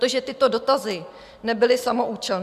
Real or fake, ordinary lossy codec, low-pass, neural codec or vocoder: real; AAC, 96 kbps; 14.4 kHz; none